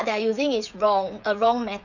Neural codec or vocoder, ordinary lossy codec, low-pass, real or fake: codec, 16 kHz, 8 kbps, FreqCodec, smaller model; none; 7.2 kHz; fake